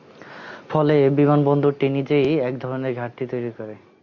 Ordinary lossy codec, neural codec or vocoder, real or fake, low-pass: MP3, 64 kbps; none; real; 7.2 kHz